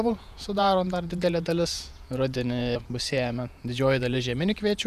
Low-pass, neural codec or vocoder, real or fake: 14.4 kHz; vocoder, 48 kHz, 128 mel bands, Vocos; fake